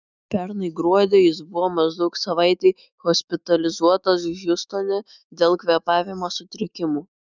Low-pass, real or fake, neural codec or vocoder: 7.2 kHz; fake; autoencoder, 48 kHz, 128 numbers a frame, DAC-VAE, trained on Japanese speech